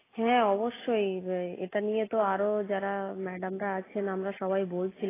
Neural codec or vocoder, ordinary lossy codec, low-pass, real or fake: none; AAC, 16 kbps; 3.6 kHz; real